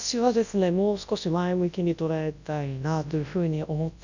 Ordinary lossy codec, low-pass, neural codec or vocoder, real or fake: none; 7.2 kHz; codec, 24 kHz, 0.9 kbps, WavTokenizer, large speech release; fake